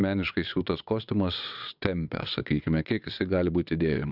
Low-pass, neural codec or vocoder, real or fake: 5.4 kHz; vocoder, 22.05 kHz, 80 mel bands, WaveNeXt; fake